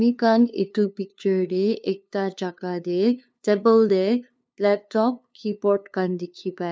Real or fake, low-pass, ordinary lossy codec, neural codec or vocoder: fake; none; none; codec, 16 kHz, 2 kbps, FunCodec, trained on LibriTTS, 25 frames a second